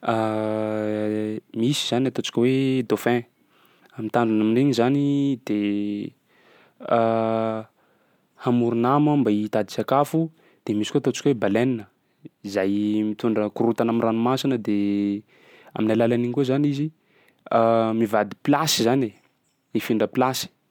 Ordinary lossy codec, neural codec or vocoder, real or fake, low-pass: MP3, 96 kbps; none; real; 19.8 kHz